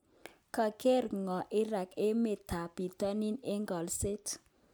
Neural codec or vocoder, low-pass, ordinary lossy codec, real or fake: none; none; none; real